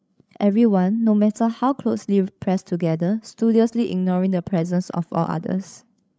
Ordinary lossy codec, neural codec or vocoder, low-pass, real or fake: none; codec, 16 kHz, 16 kbps, FreqCodec, larger model; none; fake